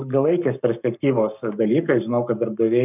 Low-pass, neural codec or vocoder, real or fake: 3.6 kHz; codec, 44.1 kHz, 7.8 kbps, Pupu-Codec; fake